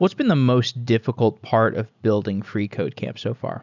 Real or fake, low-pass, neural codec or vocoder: real; 7.2 kHz; none